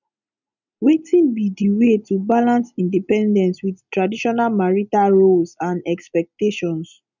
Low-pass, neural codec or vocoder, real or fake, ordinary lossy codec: 7.2 kHz; none; real; none